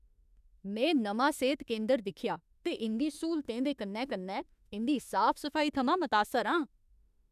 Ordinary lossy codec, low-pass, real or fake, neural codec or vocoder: none; 14.4 kHz; fake; autoencoder, 48 kHz, 32 numbers a frame, DAC-VAE, trained on Japanese speech